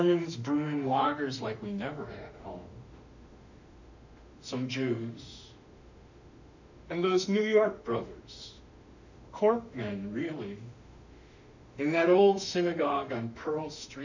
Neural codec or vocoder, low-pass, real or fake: autoencoder, 48 kHz, 32 numbers a frame, DAC-VAE, trained on Japanese speech; 7.2 kHz; fake